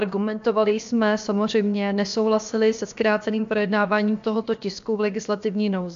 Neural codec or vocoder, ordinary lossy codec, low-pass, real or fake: codec, 16 kHz, about 1 kbps, DyCAST, with the encoder's durations; AAC, 64 kbps; 7.2 kHz; fake